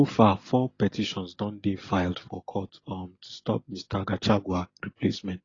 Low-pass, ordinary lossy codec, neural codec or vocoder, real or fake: 7.2 kHz; AAC, 32 kbps; none; real